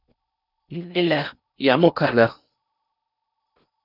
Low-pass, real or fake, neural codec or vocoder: 5.4 kHz; fake; codec, 16 kHz in and 24 kHz out, 0.8 kbps, FocalCodec, streaming, 65536 codes